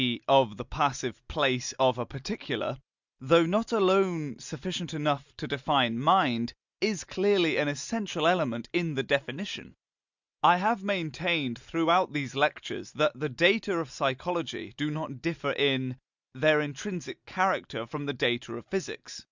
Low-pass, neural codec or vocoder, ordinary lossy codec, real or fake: 7.2 kHz; none; Opus, 64 kbps; real